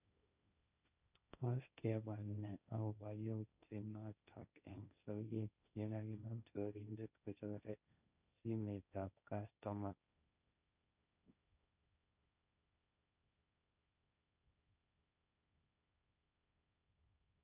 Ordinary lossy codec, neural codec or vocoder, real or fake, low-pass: none; codec, 16 kHz, 1.1 kbps, Voila-Tokenizer; fake; 3.6 kHz